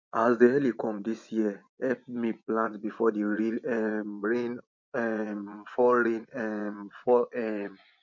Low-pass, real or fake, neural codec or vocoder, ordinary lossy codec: 7.2 kHz; fake; vocoder, 24 kHz, 100 mel bands, Vocos; MP3, 48 kbps